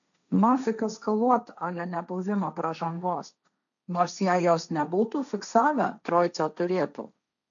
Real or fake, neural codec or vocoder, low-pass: fake; codec, 16 kHz, 1.1 kbps, Voila-Tokenizer; 7.2 kHz